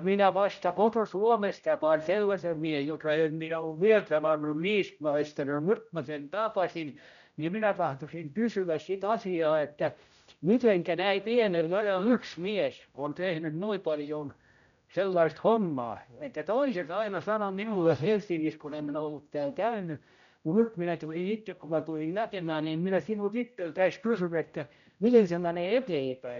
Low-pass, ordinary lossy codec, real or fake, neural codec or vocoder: 7.2 kHz; none; fake; codec, 16 kHz, 0.5 kbps, X-Codec, HuBERT features, trained on general audio